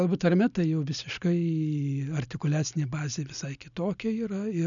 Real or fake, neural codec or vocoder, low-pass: real; none; 7.2 kHz